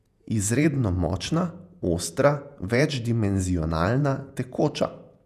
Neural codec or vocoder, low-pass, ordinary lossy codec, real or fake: vocoder, 48 kHz, 128 mel bands, Vocos; 14.4 kHz; none; fake